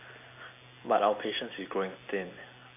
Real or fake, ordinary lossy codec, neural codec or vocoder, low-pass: real; AAC, 24 kbps; none; 3.6 kHz